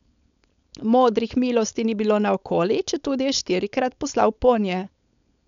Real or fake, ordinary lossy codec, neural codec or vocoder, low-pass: fake; none; codec, 16 kHz, 4.8 kbps, FACodec; 7.2 kHz